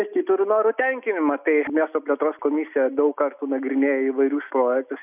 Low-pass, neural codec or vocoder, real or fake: 3.6 kHz; none; real